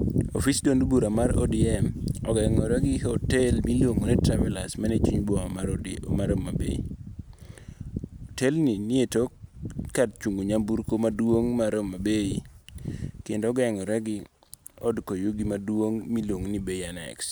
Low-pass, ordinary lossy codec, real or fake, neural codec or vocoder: none; none; real; none